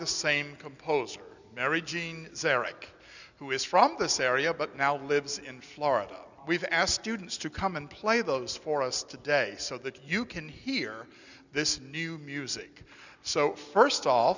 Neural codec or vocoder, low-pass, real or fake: none; 7.2 kHz; real